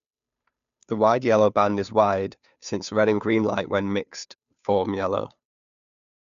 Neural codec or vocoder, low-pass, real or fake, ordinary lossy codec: codec, 16 kHz, 2 kbps, FunCodec, trained on Chinese and English, 25 frames a second; 7.2 kHz; fake; none